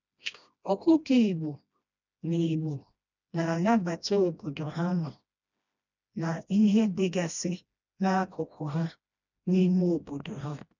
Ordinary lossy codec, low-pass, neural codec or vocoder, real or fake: none; 7.2 kHz; codec, 16 kHz, 1 kbps, FreqCodec, smaller model; fake